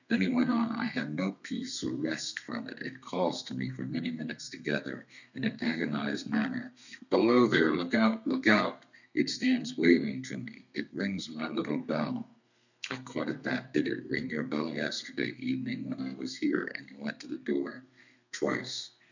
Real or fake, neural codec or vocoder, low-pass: fake; codec, 32 kHz, 1.9 kbps, SNAC; 7.2 kHz